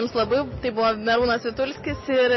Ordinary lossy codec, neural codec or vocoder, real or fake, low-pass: MP3, 24 kbps; none; real; 7.2 kHz